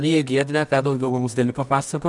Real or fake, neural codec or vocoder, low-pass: fake; codec, 24 kHz, 0.9 kbps, WavTokenizer, medium music audio release; 10.8 kHz